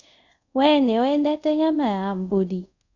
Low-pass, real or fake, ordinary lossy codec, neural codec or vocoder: 7.2 kHz; fake; none; codec, 24 kHz, 0.5 kbps, DualCodec